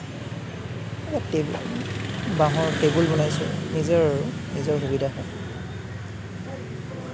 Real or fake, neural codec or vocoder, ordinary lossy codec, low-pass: real; none; none; none